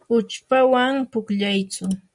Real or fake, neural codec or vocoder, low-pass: real; none; 10.8 kHz